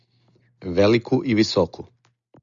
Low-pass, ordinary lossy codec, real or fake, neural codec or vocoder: 7.2 kHz; Opus, 64 kbps; real; none